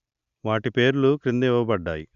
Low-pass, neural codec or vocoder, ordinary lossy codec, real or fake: 7.2 kHz; none; Opus, 64 kbps; real